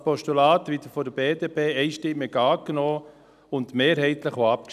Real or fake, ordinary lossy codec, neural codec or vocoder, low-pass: real; none; none; 14.4 kHz